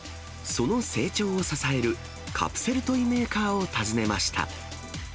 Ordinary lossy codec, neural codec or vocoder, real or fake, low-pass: none; none; real; none